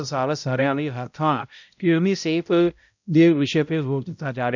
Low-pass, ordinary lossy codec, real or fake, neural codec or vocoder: 7.2 kHz; none; fake; codec, 16 kHz, 0.5 kbps, X-Codec, HuBERT features, trained on balanced general audio